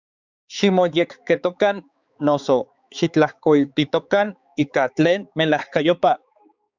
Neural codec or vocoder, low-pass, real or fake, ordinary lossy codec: codec, 16 kHz, 4 kbps, X-Codec, HuBERT features, trained on balanced general audio; 7.2 kHz; fake; Opus, 64 kbps